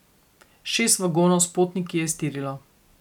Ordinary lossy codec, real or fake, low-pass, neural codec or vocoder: none; real; 19.8 kHz; none